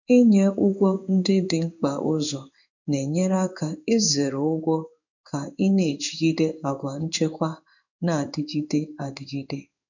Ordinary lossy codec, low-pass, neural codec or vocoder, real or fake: none; 7.2 kHz; codec, 16 kHz in and 24 kHz out, 1 kbps, XY-Tokenizer; fake